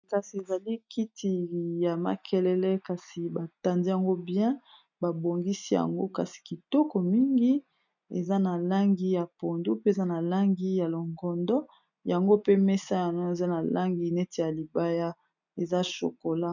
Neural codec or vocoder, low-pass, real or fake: none; 7.2 kHz; real